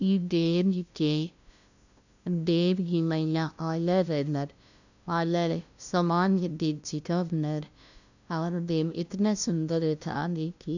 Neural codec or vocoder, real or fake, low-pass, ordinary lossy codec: codec, 16 kHz, 0.5 kbps, FunCodec, trained on LibriTTS, 25 frames a second; fake; 7.2 kHz; none